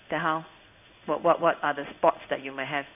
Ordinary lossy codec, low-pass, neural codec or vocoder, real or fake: none; 3.6 kHz; codec, 16 kHz in and 24 kHz out, 1 kbps, XY-Tokenizer; fake